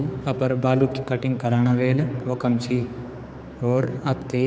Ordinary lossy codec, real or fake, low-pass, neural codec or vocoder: none; fake; none; codec, 16 kHz, 4 kbps, X-Codec, HuBERT features, trained on general audio